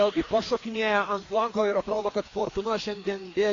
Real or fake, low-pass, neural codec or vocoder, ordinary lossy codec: fake; 7.2 kHz; codec, 16 kHz, 2 kbps, FreqCodec, larger model; AAC, 32 kbps